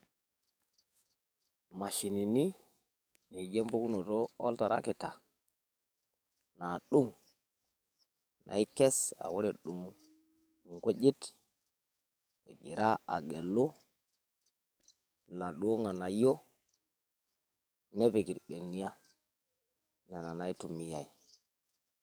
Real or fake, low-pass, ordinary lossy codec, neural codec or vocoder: fake; none; none; codec, 44.1 kHz, 7.8 kbps, DAC